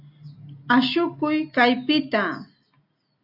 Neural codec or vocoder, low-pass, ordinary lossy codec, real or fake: none; 5.4 kHz; AAC, 48 kbps; real